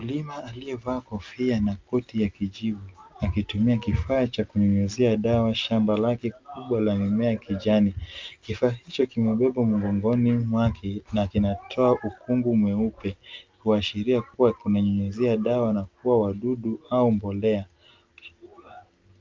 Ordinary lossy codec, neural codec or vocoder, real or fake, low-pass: Opus, 24 kbps; none; real; 7.2 kHz